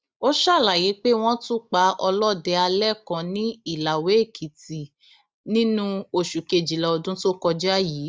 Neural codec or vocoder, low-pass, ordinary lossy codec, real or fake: none; none; none; real